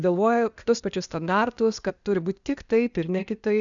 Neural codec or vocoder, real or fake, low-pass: codec, 16 kHz, 0.8 kbps, ZipCodec; fake; 7.2 kHz